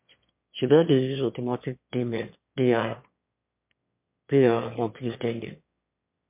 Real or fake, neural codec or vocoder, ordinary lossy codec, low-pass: fake; autoencoder, 22.05 kHz, a latent of 192 numbers a frame, VITS, trained on one speaker; MP3, 32 kbps; 3.6 kHz